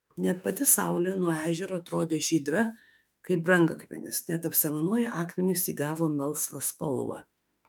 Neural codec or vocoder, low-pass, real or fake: autoencoder, 48 kHz, 32 numbers a frame, DAC-VAE, trained on Japanese speech; 19.8 kHz; fake